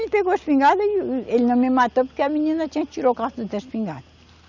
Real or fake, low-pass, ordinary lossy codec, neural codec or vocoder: real; 7.2 kHz; none; none